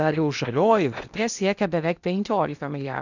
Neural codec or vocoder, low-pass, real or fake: codec, 16 kHz in and 24 kHz out, 0.8 kbps, FocalCodec, streaming, 65536 codes; 7.2 kHz; fake